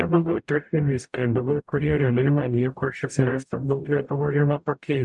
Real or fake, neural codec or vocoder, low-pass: fake; codec, 44.1 kHz, 0.9 kbps, DAC; 10.8 kHz